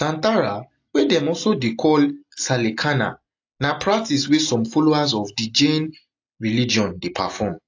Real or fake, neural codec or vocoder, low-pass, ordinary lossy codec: real; none; 7.2 kHz; AAC, 48 kbps